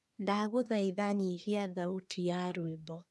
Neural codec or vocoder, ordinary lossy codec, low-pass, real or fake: codec, 24 kHz, 1 kbps, SNAC; none; none; fake